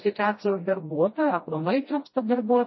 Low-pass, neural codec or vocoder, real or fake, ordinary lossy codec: 7.2 kHz; codec, 16 kHz, 1 kbps, FreqCodec, smaller model; fake; MP3, 24 kbps